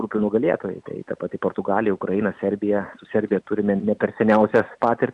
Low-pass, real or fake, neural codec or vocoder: 10.8 kHz; real; none